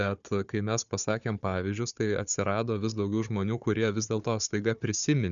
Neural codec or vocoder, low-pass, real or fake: codec, 16 kHz, 4 kbps, FunCodec, trained on Chinese and English, 50 frames a second; 7.2 kHz; fake